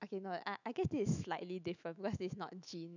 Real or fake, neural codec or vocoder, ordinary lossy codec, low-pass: fake; autoencoder, 48 kHz, 128 numbers a frame, DAC-VAE, trained on Japanese speech; none; 7.2 kHz